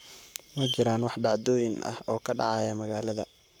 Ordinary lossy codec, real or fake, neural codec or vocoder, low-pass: none; fake; codec, 44.1 kHz, 7.8 kbps, Pupu-Codec; none